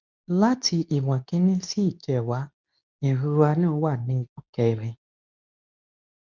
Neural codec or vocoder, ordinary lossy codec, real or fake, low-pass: codec, 24 kHz, 0.9 kbps, WavTokenizer, medium speech release version 2; none; fake; 7.2 kHz